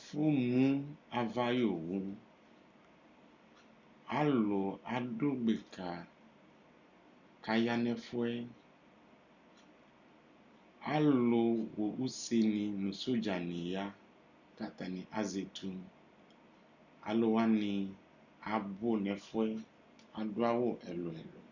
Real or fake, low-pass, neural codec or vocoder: real; 7.2 kHz; none